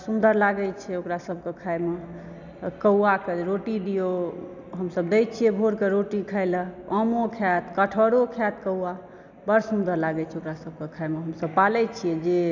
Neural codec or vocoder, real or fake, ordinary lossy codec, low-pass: none; real; none; 7.2 kHz